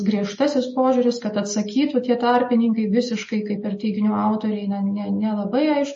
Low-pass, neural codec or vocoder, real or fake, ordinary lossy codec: 10.8 kHz; none; real; MP3, 32 kbps